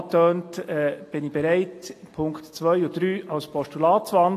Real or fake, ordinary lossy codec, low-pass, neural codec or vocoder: real; AAC, 48 kbps; 14.4 kHz; none